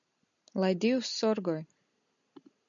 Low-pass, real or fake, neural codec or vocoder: 7.2 kHz; real; none